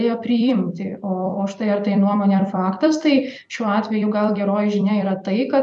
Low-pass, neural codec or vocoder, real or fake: 10.8 kHz; vocoder, 44.1 kHz, 128 mel bands every 256 samples, BigVGAN v2; fake